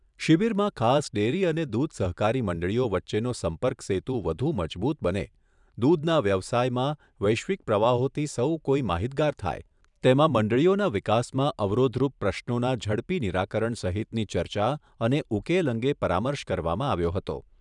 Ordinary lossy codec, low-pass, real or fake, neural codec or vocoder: none; 10.8 kHz; fake; vocoder, 44.1 kHz, 128 mel bands every 512 samples, BigVGAN v2